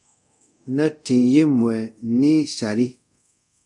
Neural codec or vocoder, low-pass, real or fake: codec, 24 kHz, 0.5 kbps, DualCodec; 10.8 kHz; fake